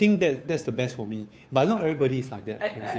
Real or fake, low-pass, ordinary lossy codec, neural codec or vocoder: fake; none; none; codec, 16 kHz, 2 kbps, FunCodec, trained on Chinese and English, 25 frames a second